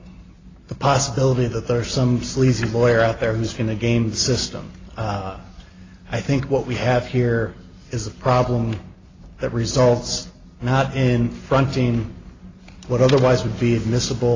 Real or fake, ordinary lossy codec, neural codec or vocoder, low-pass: real; AAC, 32 kbps; none; 7.2 kHz